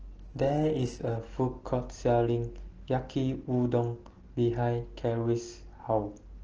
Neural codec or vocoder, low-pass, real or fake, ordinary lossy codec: none; 7.2 kHz; real; Opus, 16 kbps